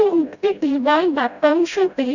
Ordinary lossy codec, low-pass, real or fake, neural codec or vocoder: none; 7.2 kHz; fake; codec, 16 kHz, 0.5 kbps, FreqCodec, smaller model